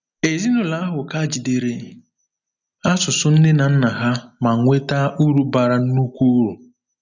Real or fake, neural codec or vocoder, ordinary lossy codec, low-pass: real; none; none; 7.2 kHz